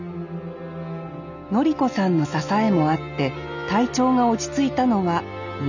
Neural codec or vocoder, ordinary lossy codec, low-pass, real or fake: none; none; 7.2 kHz; real